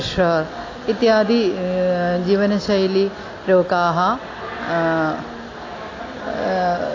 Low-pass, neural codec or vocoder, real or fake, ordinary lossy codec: 7.2 kHz; none; real; AAC, 32 kbps